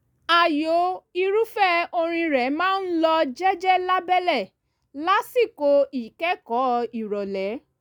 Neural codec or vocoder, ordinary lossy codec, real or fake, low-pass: none; none; real; 19.8 kHz